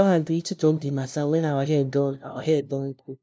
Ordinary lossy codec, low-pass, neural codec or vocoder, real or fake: none; none; codec, 16 kHz, 0.5 kbps, FunCodec, trained on LibriTTS, 25 frames a second; fake